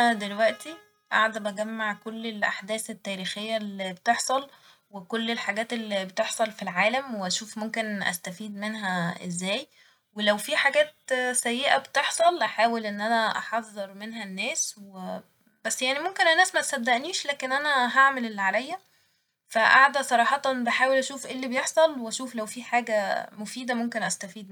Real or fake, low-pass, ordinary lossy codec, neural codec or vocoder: real; 19.8 kHz; none; none